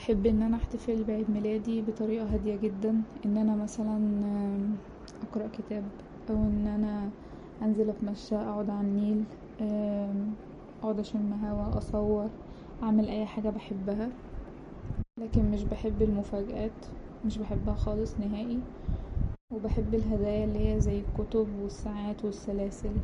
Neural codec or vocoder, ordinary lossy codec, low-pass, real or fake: none; MP3, 48 kbps; 19.8 kHz; real